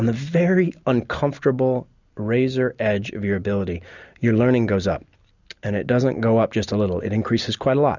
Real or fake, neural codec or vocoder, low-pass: real; none; 7.2 kHz